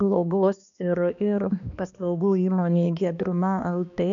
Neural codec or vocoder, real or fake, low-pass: codec, 16 kHz, 2 kbps, X-Codec, HuBERT features, trained on balanced general audio; fake; 7.2 kHz